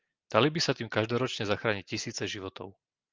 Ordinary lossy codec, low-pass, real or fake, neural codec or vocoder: Opus, 24 kbps; 7.2 kHz; real; none